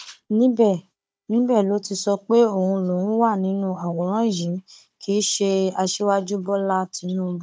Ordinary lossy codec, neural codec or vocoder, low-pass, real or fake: none; codec, 16 kHz, 16 kbps, FunCodec, trained on Chinese and English, 50 frames a second; none; fake